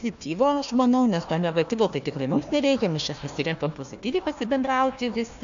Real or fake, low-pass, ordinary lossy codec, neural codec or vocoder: fake; 7.2 kHz; AAC, 64 kbps; codec, 16 kHz, 1 kbps, FunCodec, trained on Chinese and English, 50 frames a second